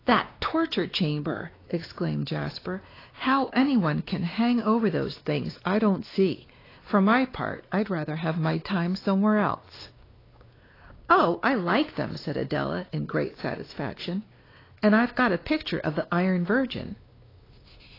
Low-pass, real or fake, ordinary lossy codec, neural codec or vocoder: 5.4 kHz; fake; AAC, 24 kbps; codec, 16 kHz, 2 kbps, X-Codec, WavLM features, trained on Multilingual LibriSpeech